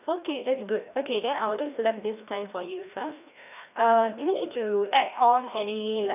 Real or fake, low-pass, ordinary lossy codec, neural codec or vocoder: fake; 3.6 kHz; none; codec, 16 kHz, 1 kbps, FreqCodec, larger model